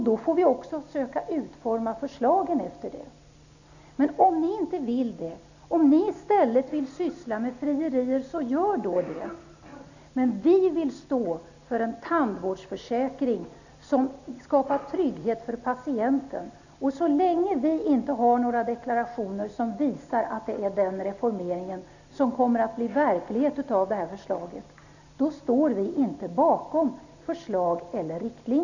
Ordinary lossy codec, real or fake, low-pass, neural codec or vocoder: none; real; 7.2 kHz; none